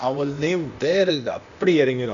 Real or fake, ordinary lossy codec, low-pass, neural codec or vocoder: fake; none; 7.2 kHz; codec, 16 kHz, 0.8 kbps, ZipCodec